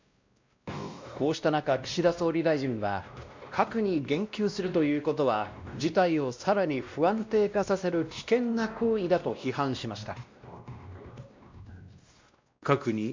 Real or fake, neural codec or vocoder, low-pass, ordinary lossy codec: fake; codec, 16 kHz, 1 kbps, X-Codec, WavLM features, trained on Multilingual LibriSpeech; 7.2 kHz; MP3, 64 kbps